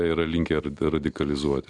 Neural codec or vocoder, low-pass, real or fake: none; 10.8 kHz; real